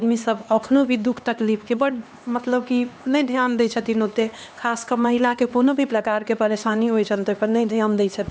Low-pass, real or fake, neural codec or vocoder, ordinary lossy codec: none; fake; codec, 16 kHz, 2 kbps, X-Codec, HuBERT features, trained on LibriSpeech; none